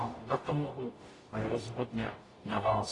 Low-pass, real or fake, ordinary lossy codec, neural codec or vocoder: 10.8 kHz; fake; AAC, 32 kbps; codec, 44.1 kHz, 0.9 kbps, DAC